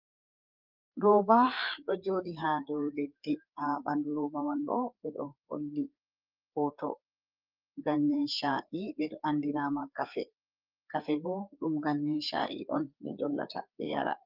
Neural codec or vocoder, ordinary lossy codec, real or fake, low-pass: codec, 16 kHz, 4 kbps, FreqCodec, larger model; Opus, 32 kbps; fake; 5.4 kHz